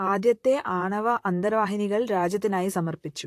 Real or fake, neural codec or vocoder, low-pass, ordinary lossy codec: fake; vocoder, 44.1 kHz, 128 mel bands, Pupu-Vocoder; 14.4 kHz; AAC, 64 kbps